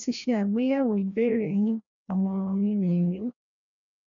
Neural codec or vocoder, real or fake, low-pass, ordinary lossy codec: codec, 16 kHz, 1 kbps, FreqCodec, larger model; fake; 7.2 kHz; none